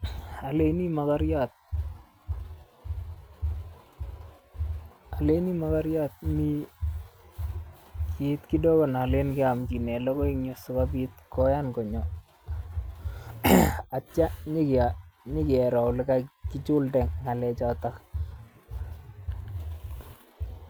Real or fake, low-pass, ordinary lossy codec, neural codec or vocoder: real; none; none; none